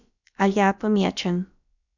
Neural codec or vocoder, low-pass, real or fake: codec, 16 kHz, about 1 kbps, DyCAST, with the encoder's durations; 7.2 kHz; fake